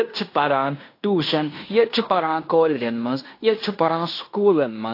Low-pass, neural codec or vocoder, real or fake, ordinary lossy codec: 5.4 kHz; codec, 16 kHz in and 24 kHz out, 0.9 kbps, LongCat-Audio-Codec, fine tuned four codebook decoder; fake; AAC, 32 kbps